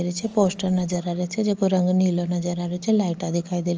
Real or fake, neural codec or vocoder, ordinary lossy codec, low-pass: real; none; Opus, 24 kbps; 7.2 kHz